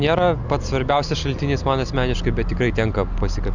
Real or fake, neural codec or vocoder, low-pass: real; none; 7.2 kHz